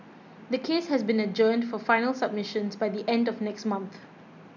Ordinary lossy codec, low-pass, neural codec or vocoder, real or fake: none; 7.2 kHz; none; real